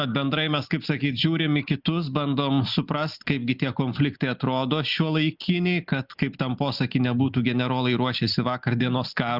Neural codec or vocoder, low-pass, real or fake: none; 5.4 kHz; real